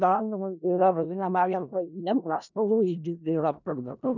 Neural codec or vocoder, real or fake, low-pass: codec, 16 kHz in and 24 kHz out, 0.4 kbps, LongCat-Audio-Codec, four codebook decoder; fake; 7.2 kHz